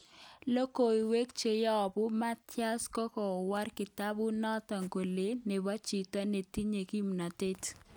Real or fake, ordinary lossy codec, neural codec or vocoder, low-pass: real; none; none; none